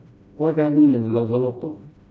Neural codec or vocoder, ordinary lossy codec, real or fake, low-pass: codec, 16 kHz, 1 kbps, FreqCodec, smaller model; none; fake; none